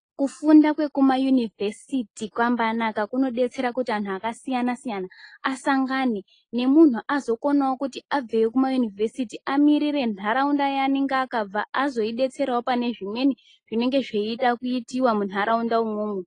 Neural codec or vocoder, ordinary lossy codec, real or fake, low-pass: none; AAC, 32 kbps; real; 9.9 kHz